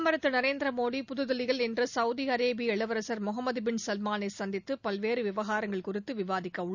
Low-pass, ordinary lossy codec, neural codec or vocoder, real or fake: 7.2 kHz; none; none; real